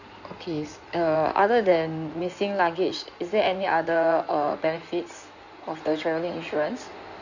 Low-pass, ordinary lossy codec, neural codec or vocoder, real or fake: 7.2 kHz; none; codec, 16 kHz in and 24 kHz out, 2.2 kbps, FireRedTTS-2 codec; fake